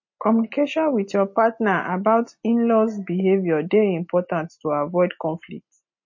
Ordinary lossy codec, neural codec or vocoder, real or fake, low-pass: MP3, 32 kbps; none; real; 7.2 kHz